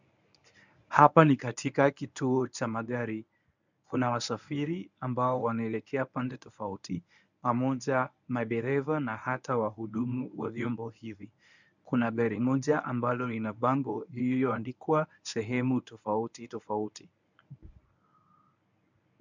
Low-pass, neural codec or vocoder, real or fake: 7.2 kHz; codec, 24 kHz, 0.9 kbps, WavTokenizer, medium speech release version 1; fake